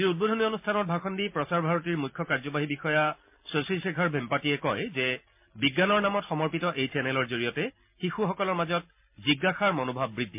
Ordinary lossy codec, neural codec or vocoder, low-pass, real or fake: MP3, 32 kbps; none; 3.6 kHz; real